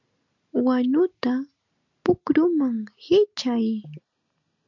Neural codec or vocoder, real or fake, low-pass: none; real; 7.2 kHz